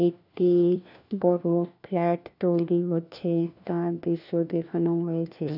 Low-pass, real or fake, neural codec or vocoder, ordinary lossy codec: 5.4 kHz; fake; codec, 16 kHz, 1 kbps, FunCodec, trained on LibriTTS, 50 frames a second; none